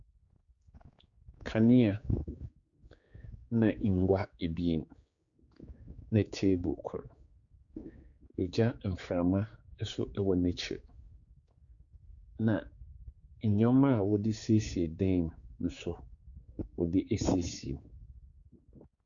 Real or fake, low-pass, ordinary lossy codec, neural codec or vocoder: fake; 7.2 kHz; Opus, 64 kbps; codec, 16 kHz, 4 kbps, X-Codec, HuBERT features, trained on general audio